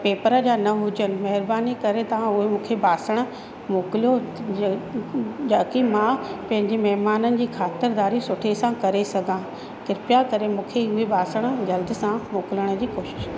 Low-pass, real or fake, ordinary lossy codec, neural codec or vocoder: none; real; none; none